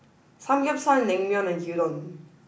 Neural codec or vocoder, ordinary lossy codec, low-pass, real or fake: none; none; none; real